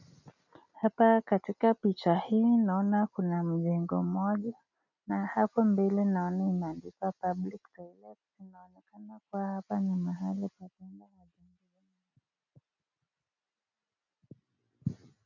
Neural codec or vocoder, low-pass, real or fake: none; 7.2 kHz; real